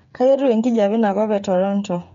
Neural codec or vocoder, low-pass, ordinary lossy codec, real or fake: codec, 16 kHz, 8 kbps, FreqCodec, smaller model; 7.2 kHz; MP3, 48 kbps; fake